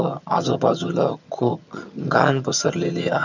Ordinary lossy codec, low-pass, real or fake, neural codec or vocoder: none; 7.2 kHz; fake; vocoder, 22.05 kHz, 80 mel bands, HiFi-GAN